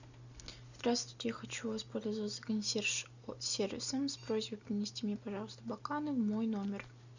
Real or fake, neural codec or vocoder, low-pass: real; none; 7.2 kHz